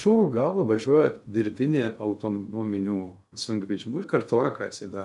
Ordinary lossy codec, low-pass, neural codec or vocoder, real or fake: MP3, 96 kbps; 10.8 kHz; codec, 16 kHz in and 24 kHz out, 0.6 kbps, FocalCodec, streaming, 2048 codes; fake